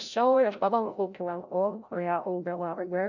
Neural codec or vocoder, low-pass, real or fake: codec, 16 kHz, 0.5 kbps, FreqCodec, larger model; 7.2 kHz; fake